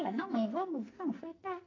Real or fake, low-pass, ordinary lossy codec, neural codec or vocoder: fake; 7.2 kHz; AAC, 32 kbps; codec, 44.1 kHz, 2.6 kbps, SNAC